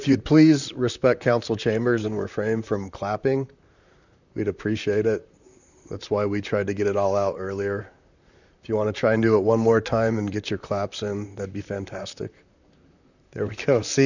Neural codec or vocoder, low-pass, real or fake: vocoder, 44.1 kHz, 128 mel bands, Pupu-Vocoder; 7.2 kHz; fake